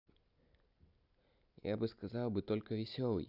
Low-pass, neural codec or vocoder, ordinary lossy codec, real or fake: 5.4 kHz; none; none; real